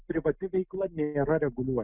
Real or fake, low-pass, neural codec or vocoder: real; 3.6 kHz; none